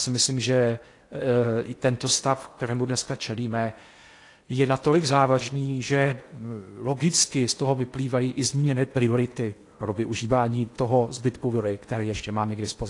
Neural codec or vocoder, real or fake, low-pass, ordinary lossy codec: codec, 16 kHz in and 24 kHz out, 0.8 kbps, FocalCodec, streaming, 65536 codes; fake; 10.8 kHz; AAC, 48 kbps